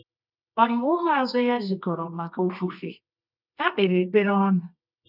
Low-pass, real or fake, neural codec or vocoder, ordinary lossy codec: 5.4 kHz; fake; codec, 24 kHz, 0.9 kbps, WavTokenizer, medium music audio release; none